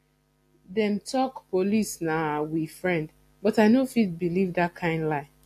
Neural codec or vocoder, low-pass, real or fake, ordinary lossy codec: none; 14.4 kHz; real; AAC, 64 kbps